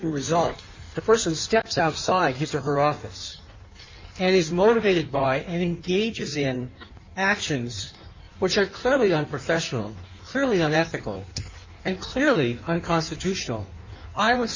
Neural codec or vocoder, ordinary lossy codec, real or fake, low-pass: codec, 16 kHz in and 24 kHz out, 1.1 kbps, FireRedTTS-2 codec; MP3, 48 kbps; fake; 7.2 kHz